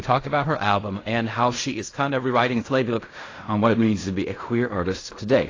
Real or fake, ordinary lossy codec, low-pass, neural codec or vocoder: fake; AAC, 32 kbps; 7.2 kHz; codec, 16 kHz in and 24 kHz out, 0.4 kbps, LongCat-Audio-Codec, fine tuned four codebook decoder